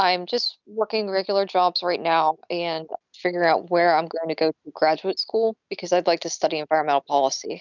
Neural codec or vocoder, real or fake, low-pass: none; real; 7.2 kHz